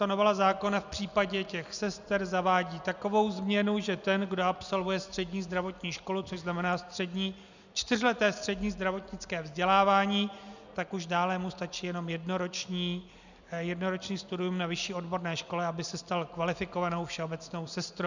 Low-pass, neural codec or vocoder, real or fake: 7.2 kHz; none; real